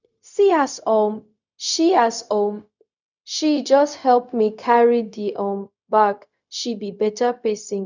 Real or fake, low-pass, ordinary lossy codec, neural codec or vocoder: fake; 7.2 kHz; none; codec, 16 kHz, 0.4 kbps, LongCat-Audio-Codec